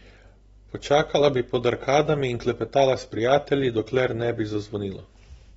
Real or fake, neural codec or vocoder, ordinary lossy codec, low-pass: real; none; AAC, 24 kbps; 19.8 kHz